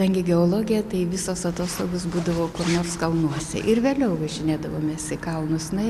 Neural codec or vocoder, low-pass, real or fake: none; 14.4 kHz; real